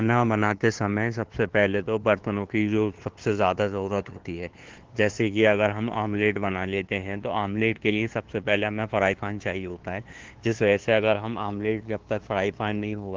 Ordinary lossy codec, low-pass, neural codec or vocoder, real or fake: Opus, 16 kbps; 7.2 kHz; codec, 16 kHz, 2 kbps, FunCodec, trained on LibriTTS, 25 frames a second; fake